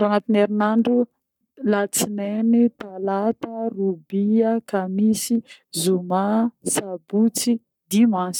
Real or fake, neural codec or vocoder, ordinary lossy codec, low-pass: fake; codec, 44.1 kHz, 7.8 kbps, Pupu-Codec; none; 19.8 kHz